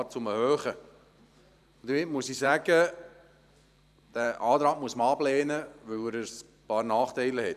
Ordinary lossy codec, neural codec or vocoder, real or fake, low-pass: none; vocoder, 44.1 kHz, 128 mel bands every 512 samples, BigVGAN v2; fake; 14.4 kHz